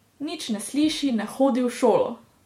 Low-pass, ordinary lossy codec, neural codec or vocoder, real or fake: 19.8 kHz; MP3, 64 kbps; vocoder, 48 kHz, 128 mel bands, Vocos; fake